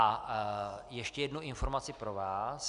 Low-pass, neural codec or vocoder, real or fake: 10.8 kHz; none; real